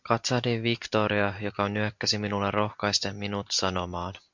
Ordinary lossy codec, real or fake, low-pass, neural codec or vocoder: MP3, 64 kbps; real; 7.2 kHz; none